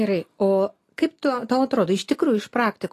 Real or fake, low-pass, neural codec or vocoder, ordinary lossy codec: real; 14.4 kHz; none; AAC, 48 kbps